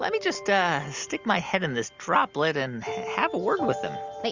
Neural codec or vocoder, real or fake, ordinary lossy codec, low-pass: none; real; Opus, 64 kbps; 7.2 kHz